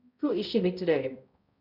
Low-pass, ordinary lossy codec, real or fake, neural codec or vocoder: 5.4 kHz; Opus, 64 kbps; fake; codec, 16 kHz, 0.5 kbps, X-Codec, HuBERT features, trained on balanced general audio